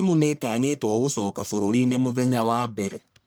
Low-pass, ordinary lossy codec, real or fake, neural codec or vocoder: none; none; fake; codec, 44.1 kHz, 1.7 kbps, Pupu-Codec